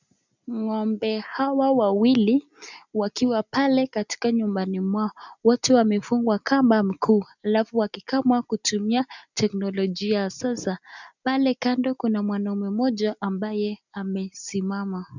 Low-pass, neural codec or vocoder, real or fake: 7.2 kHz; none; real